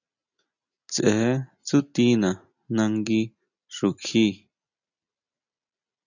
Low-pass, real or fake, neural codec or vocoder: 7.2 kHz; real; none